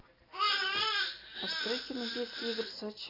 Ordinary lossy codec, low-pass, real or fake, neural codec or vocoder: AAC, 24 kbps; 5.4 kHz; real; none